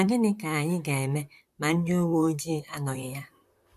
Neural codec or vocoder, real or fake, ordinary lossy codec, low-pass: vocoder, 44.1 kHz, 128 mel bands, Pupu-Vocoder; fake; AAC, 96 kbps; 14.4 kHz